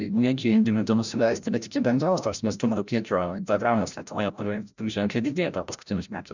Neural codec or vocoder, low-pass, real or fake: codec, 16 kHz, 0.5 kbps, FreqCodec, larger model; 7.2 kHz; fake